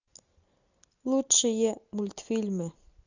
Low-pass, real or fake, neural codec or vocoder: 7.2 kHz; real; none